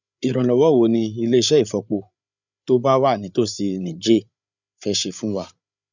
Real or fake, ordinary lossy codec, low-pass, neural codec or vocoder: fake; none; 7.2 kHz; codec, 16 kHz, 8 kbps, FreqCodec, larger model